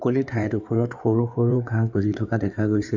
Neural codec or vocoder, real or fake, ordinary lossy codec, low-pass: codec, 16 kHz in and 24 kHz out, 2.2 kbps, FireRedTTS-2 codec; fake; none; 7.2 kHz